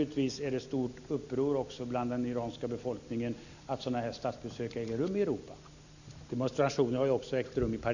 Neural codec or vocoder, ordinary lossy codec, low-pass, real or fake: none; none; 7.2 kHz; real